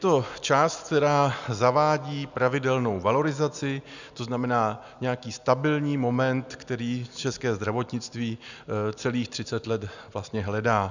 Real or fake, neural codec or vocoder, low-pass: real; none; 7.2 kHz